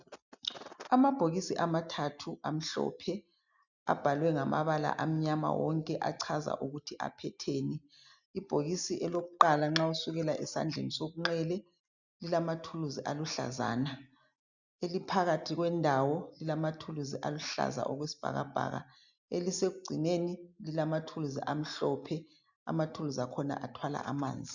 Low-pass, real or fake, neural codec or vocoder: 7.2 kHz; real; none